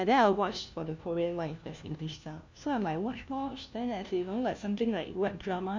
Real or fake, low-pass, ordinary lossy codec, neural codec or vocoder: fake; 7.2 kHz; MP3, 64 kbps; codec, 16 kHz, 1 kbps, FunCodec, trained on LibriTTS, 50 frames a second